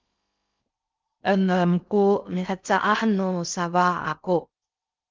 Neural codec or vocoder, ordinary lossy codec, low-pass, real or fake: codec, 16 kHz in and 24 kHz out, 0.6 kbps, FocalCodec, streaming, 4096 codes; Opus, 24 kbps; 7.2 kHz; fake